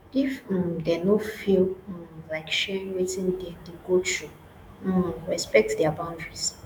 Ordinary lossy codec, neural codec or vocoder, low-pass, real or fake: none; autoencoder, 48 kHz, 128 numbers a frame, DAC-VAE, trained on Japanese speech; none; fake